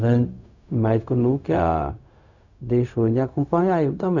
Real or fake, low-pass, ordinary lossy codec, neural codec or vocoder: fake; 7.2 kHz; none; codec, 16 kHz, 0.4 kbps, LongCat-Audio-Codec